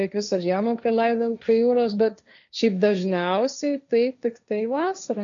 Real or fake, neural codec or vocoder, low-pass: fake; codec, 16 kHz, 1.1 kbps, Voila-Tokenizer; 7.2 kHz